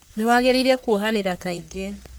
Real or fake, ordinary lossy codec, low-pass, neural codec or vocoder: fake; none; none; codec, 44.1 kHz, 1.7 kbps, Pupu-Codec